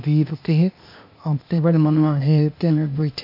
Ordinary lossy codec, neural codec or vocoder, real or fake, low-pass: none; codec, 16 kHz, 0.8 kbps, ZipCodec; fake; 5.4 kHz